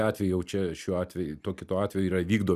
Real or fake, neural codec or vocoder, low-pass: real; none; 14.4 kHz